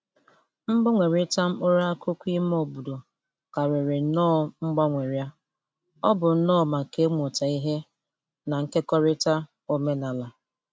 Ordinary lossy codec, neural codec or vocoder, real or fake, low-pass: none; none; real; none